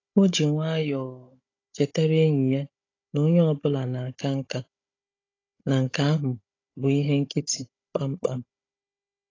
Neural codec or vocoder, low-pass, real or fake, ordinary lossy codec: codec, 16 kHz, 16 kbps, FunCodec, trained on Chinese and English, 50 frames a second; 7.2 kHz; fake; AAC, 32 kbps